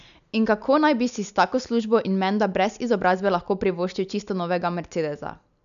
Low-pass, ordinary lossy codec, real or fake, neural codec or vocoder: 7.2 kHz; none; real; none